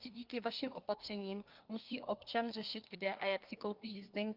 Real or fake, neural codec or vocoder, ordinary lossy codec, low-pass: fake; codec, 24 kHz, 1 kbps, SNAC; Opus, 24 kbps; 5.4 kHz